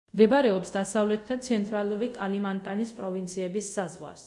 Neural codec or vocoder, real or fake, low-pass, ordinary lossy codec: codec, 24 kHz, 0.5 kbps, DualCodec; fake; 10.8 kHz; MP3, 64 kbps